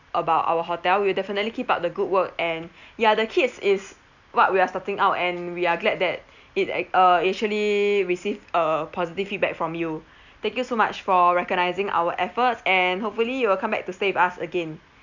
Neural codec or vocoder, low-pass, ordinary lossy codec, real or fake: none; 7.2 kHz; none; real